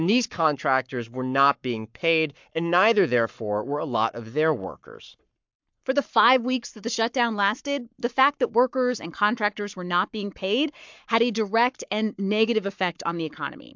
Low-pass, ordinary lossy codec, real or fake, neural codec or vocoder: 7.2 kHz; MP3, 64 kbps; fake; codec, 44.1 kHz, 7.8 kbps, Pupu-Codec